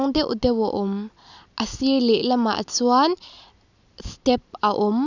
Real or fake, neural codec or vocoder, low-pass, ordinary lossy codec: real; none; 7.2 kHz; none